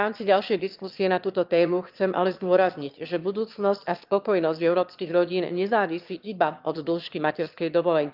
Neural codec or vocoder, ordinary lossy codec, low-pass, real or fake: autoencoder, 22.05 kHz, a latent of 192 numbers a frame, VITS, trained on one speaker; Opus, 32 kbps; 5.4 kHz; fake